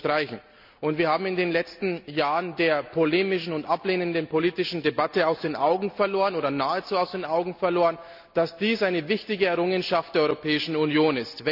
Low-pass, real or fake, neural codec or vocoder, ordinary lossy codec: 5.4 kHz; real; none; none